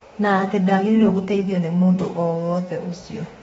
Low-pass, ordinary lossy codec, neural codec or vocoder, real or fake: 19.8 kHz; AAC, 24 kbps; autoencoder, 48 kHz, 32 numbers a frame, DAC-VAE, trained on Japanese speech; fake